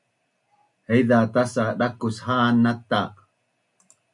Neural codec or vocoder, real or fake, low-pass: none; real; 10.8 kHz